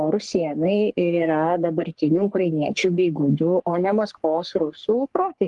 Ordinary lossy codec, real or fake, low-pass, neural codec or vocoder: Opus, 24 kbps; fake; 10.8 kHz; codec, 44.1 kHz, 3.4 kbps, Pupu-Codec